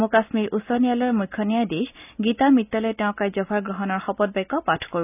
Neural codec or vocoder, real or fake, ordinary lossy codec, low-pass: none; real; none; 3.6 kHz